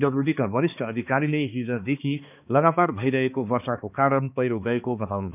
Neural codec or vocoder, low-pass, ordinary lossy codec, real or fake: codec, 16 kHz, 2 kbps, X-Codec, HuBERT features, trained on balanced general audio; 3.6 kHz; none; fake